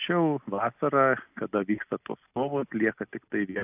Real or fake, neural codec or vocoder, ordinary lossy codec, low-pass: real; none; AAC, 32 kbps; 3.6 kHz